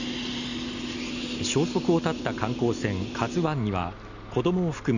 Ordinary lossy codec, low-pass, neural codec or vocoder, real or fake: none; 7.2 kHz; none; real